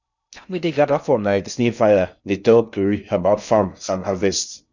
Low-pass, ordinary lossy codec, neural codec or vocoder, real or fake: 7.2 kHz; none; codec, 16 kHz in and 24 kHz out, 0.6 kbps, FocalCodec, streaming, 2048 codes; fake